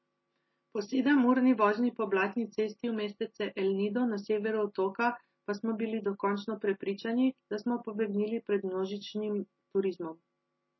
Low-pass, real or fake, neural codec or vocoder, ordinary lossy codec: 7.2 kHz; real; none; MP3, 24 kbps